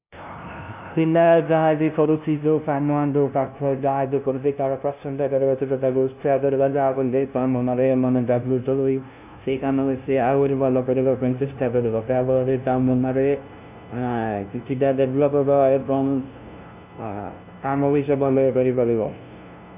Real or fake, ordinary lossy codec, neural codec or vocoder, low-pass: fake; none; codec, 16 kHz, 0.5 kbps, FunCodec, trained on LibriTTS, 25 frames a second; 3.6 kHz